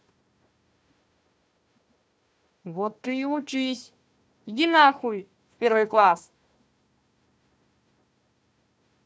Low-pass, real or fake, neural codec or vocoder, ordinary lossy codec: none; fake; codec, 16 kHz, 1 kbps, FunCodec, trained on Chinese and English, 50 frames a second; none